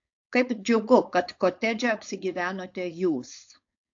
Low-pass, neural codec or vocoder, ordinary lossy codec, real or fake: 7.2 kHz; codec, 16 kHz, 4.8 kbps, FACodec; AAC, 48 kbps; fake